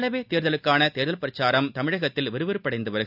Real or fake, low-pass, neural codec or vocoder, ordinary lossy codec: real; 5.4 kHz; none; none